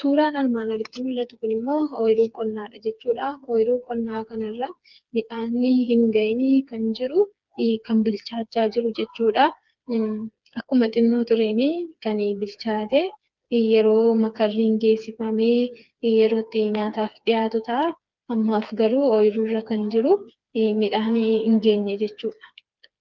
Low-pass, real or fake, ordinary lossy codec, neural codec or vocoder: 7.2 kHz; fake; Opus, 32 kbps; codec, 16 kHz, 4 kbps, FreqCodec, smaller model